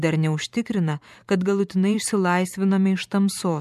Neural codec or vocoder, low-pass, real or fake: vocoder, 44.1 kHz, 128 mel bands every 512 samples, BigVGAN v2; 14.4 kHz; fake